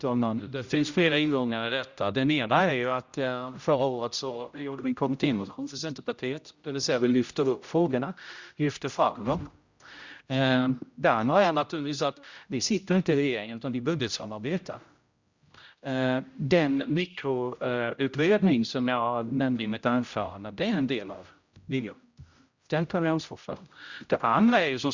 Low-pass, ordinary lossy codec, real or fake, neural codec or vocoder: 7.2 kHz; Opus, 64 kbps; fake; codec, 16 kHz, 0.5 kbps, X-Codec, HuBERT features, trained on general audio